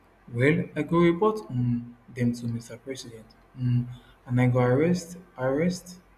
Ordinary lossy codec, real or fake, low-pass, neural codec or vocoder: none; real; 14.4 kHz; none